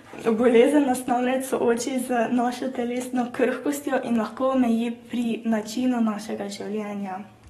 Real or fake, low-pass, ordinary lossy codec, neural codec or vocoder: fake; 19.8 kHz; AAC, 32 kbps; codec, 44.1 kHz, 7.8 kbps, DAC